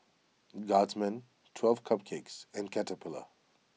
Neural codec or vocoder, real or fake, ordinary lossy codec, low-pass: none; real; none; none